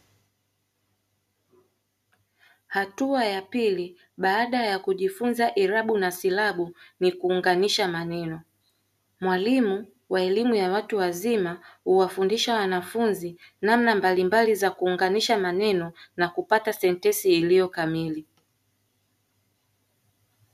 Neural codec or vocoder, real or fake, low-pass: none; real; 14.4 kHz